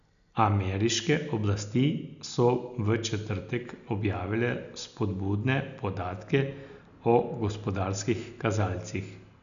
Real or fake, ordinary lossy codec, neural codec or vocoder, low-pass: real; none; none; 7.2 kHz